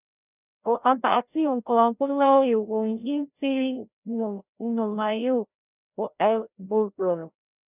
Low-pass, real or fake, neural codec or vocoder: 3.6 kHz; fake; codec, 16 kHz, 0.5 kbps, FreqCodec, larger model